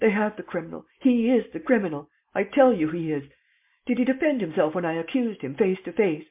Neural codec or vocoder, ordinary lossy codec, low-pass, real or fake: none; MP3, 32 kbps; 3.6 kHz; real